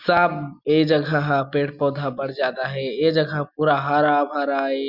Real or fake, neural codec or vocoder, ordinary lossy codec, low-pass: real; none; Opus, 64 kbps; 5.4 kHz